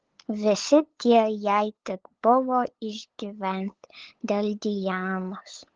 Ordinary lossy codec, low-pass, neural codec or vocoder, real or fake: Opus, 16 kbps; 7.2 kHz; codec, 16 kHz, 8 kbps, FunCodec, trained on LibriTTS, 25 frames a second; fake